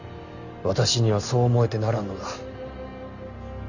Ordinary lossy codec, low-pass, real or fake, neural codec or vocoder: none; 7.2 kHz; real; none